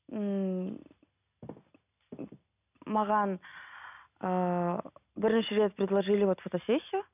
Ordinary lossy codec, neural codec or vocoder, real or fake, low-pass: none; none; real; 3.6 kHz